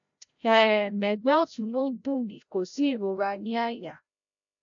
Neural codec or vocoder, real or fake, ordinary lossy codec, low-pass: codec, 16 kHz, 0.5 kbps, FreqCodec, larger model; fake; AAC, 64 kbps; 7.2 kHz